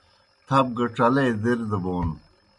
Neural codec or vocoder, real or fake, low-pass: none; real; 10.8 kHz